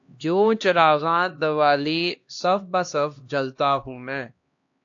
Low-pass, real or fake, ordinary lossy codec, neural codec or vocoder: 7.2 kHz; fake; AAC, 48 kbps; codec, 16 kHz, 1 kbps, X-Codec, HuBERT features, trained on LibriSpeech